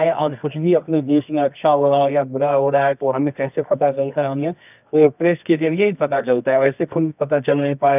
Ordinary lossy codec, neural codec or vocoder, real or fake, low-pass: none; codec, 24 kHz, 0.9 kbps, WavTokenizer, medium music audio release; fake; 3.6 kHz